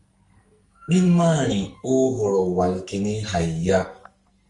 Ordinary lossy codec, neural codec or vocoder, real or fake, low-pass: Opus, 32 kbps; codec, 44.1 kHz, 2.6 kbps, SNAC; fake; 10.8 kHz